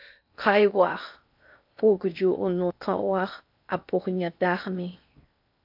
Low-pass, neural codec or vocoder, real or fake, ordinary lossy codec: 5.4 kHz; codec, 16 kHz in and 24 kHz out, 0.6 kbps, FocalCodec, streaming, 2048 codes; fake; AAC, 48 kbps